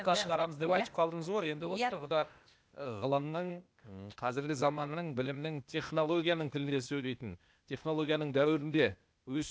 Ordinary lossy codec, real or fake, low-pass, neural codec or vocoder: none; fake; none; codec, 16 kHz, 0.8 kbps, ZipCodec